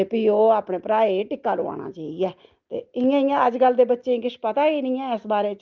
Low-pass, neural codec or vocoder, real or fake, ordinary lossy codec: 7.2 kHz; none; real; Opus, 32 kbps